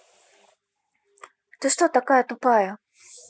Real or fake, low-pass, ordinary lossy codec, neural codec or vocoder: real; none; none; none